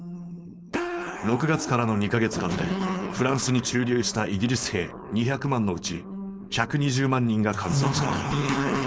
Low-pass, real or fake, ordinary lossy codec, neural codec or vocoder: none; fake; none; codec, 16 kHz, 4.8 kbps, FACodec